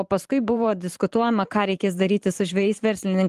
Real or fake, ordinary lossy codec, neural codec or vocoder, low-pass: fake; Opus, 24 kbps; vocoder, 44.1 kHz, 128 mel bands every 512 samples, BigVGAN v2; 14.4 kHz